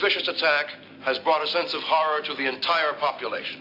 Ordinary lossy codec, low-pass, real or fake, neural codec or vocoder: AAC, 32 kbps; 5.4 kHz; real; none